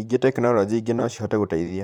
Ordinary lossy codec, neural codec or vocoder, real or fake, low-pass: none; vocoder, 44.1 kHz, 128 mel bands, Pupu-Vocoder; fake; 19.8 kHz